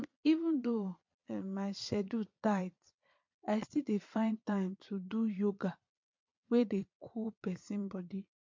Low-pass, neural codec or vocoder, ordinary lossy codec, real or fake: 7.2 kHz; vocoder, 22.05 kHz, 80 mel bands, Vocos; MP3, 48 kbps; fake